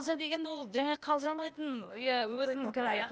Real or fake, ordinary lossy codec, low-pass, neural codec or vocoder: fake; none; none; codec, 16 kHz, 0.8 kbps, ZipCodec